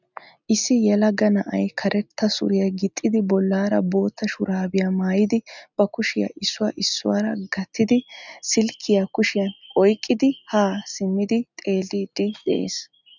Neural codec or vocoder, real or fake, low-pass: none; real; 7.2 kHz